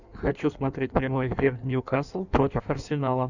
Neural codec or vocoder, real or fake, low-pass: codec, 16 kHz in and 24 kHz out, 1.1 kbps, FireRedTTS-2 codec; fake; 7.2 kHz